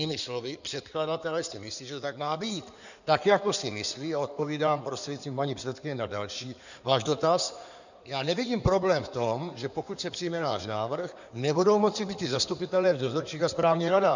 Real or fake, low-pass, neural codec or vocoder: fake; 7.2 kHz; codec, 16 kHz in and 24 kHz out, 2.2 kbps, FireRedTTS-2 codec